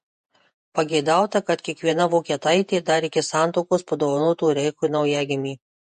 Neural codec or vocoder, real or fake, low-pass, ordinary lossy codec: vocoder, 48 kHz, 128 mel bands, Vocos; fake; 14.4 kHz; MP3, 48 kbps